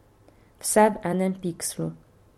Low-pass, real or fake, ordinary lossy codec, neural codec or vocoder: 19.8 kHz; fake; MP3, 64 kbps; vocoder, 44.1 kHz, 128 mel bands every 256 samples, BigVGAN v2